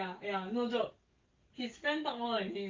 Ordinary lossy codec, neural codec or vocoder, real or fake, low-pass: Opus, 32 kbps; codec, 44.1 kHz, 7.8 kbps, DAC; fake; 7.2 kHz